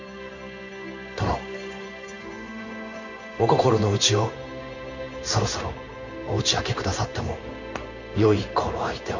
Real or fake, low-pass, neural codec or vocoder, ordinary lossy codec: fake; 7.2 kHz; codec, 16 kHz in and 24 kHz out, 1 kbps, XY-Tokenizer; none